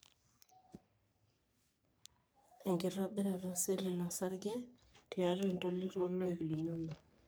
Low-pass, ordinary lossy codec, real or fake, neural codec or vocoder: none; none; fake; codec, 44.1 kHz, 3.4 kbps, Pupu-Codec